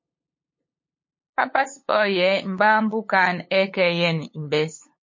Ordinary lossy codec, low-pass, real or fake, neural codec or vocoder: MP3, 32 kbps; 7.2 kHz; fake; codec, 16 kHz, 8 kbps, FunCodec, trained on LibriTTS, 25 frames a second